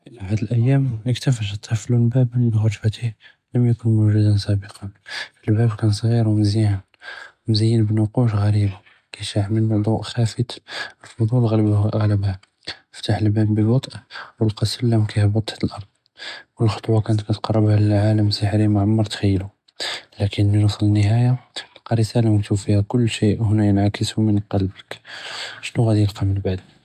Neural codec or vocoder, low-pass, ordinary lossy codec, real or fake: none; 14.4 kHz; AAC, 96 kbps; real